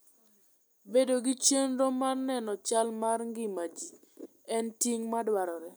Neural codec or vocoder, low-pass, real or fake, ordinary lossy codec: none; none; real; none